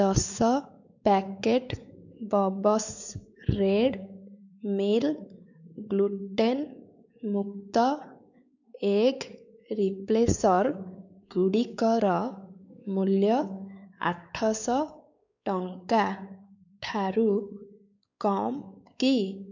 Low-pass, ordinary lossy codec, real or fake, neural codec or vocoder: 7.2 kHz; none; fake; codec, 16 kHz, 4 kbps, X-Codec, WavLM features, trained on Multilingual LibriSpeech